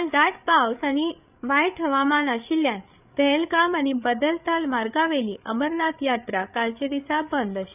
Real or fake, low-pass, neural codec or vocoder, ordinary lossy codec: fake; 3.6 kHz; codec, 16 kHz, 8 kbps, FreqCodec, larger model; none